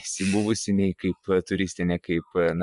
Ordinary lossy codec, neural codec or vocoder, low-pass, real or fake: MP3, 96 kbps; vocoder, 24 kHz, 100 mel bands, Vocos; 10.8 kHz; fake